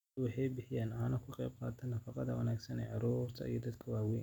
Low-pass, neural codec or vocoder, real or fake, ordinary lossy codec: 19.8 kHz; none; real; none